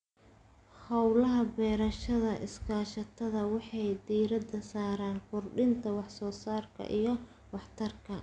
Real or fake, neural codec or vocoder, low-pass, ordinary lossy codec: real; none; 9.9 kHz; none